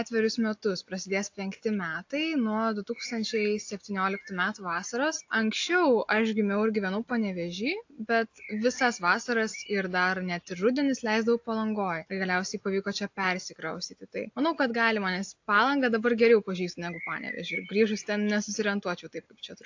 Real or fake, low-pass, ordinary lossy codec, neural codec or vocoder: real; 7.2 kHz; AAC, 48 kbps; none